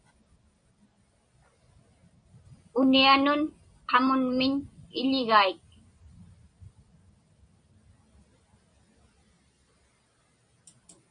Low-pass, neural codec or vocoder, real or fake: 9.9 kHz; none; real